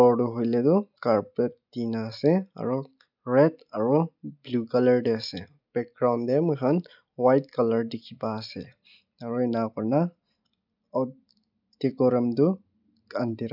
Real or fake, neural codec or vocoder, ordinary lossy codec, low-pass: real; none; none; 5.4 kHz